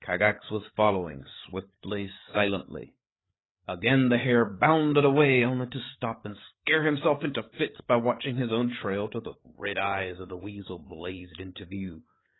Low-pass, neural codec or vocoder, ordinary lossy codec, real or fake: 7.2 kHz; codec, 16 kHz, 8 kbps, FreqCodec, larger model; AAC, 16 kbps; fake